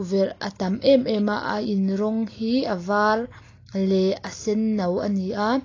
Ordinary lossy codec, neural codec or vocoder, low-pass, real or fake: AAC, 32 kbps; none; 7.2 kHz; real